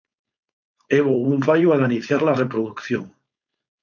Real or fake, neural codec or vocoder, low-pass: fake; codec, 16 kHz, 4.8 kbps, FACodec; 7.2 kHz